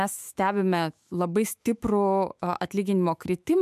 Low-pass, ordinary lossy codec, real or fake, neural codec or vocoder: 14.4 kHz; MP3, 96 kbps; fake; autoencoder, 48 kHz, 128 numbers a frame, DAC-VAE, trained on Japanese speech